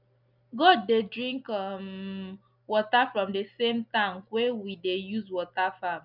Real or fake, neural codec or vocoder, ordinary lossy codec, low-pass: real; none; none; 5.4 kHz